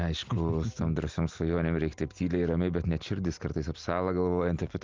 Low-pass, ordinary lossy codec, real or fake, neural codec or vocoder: 7.2 kHz; Opus, 24 kbps; real; none